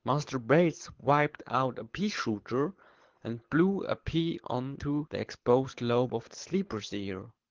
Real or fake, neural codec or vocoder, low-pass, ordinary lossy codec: fake; codec, 24 kHz, 6 kbps, HILCodec; 7.2 kHz; Opus, 16 kbps